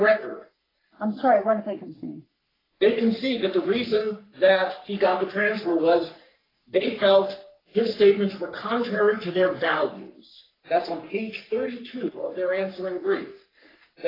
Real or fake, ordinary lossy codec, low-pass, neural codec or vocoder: fake; AAC, 24 kbps; 5.4 kHz; codec, 44.1 kHz, 3.4 kbps, Pupu-Codec